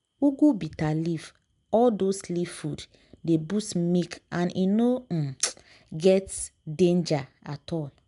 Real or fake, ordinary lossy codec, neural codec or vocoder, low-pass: real; none; none; 10.8 kHz